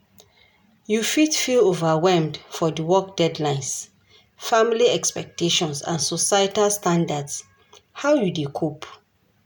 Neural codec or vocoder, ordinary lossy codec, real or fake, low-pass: none; none; real; none